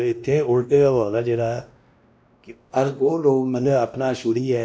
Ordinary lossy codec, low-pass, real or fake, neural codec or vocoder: none; none; fake; codec, 16 kHz, 1 kbps, X-Codec, WavLM features, trained on Multilingual LibriSpeech